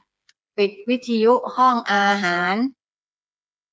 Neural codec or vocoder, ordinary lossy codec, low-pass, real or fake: codec, 16 kHz, 4 kbps, FreqCodec, smaller model; none; none; fake